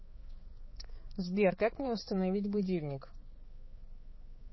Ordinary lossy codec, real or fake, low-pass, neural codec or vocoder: MP3, 24 kbps; fake; 7.2 kHz; codec, 16 kHz, 4 kbps, X-Codec, HuBERT features, trained on balanced general audio